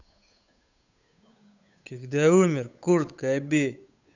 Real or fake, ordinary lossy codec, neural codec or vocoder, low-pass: fake; none; codec, 16 kHz, 8 kbps, FunCodec, trained on Chinese and English, 25 frames a second; 7.2 kHz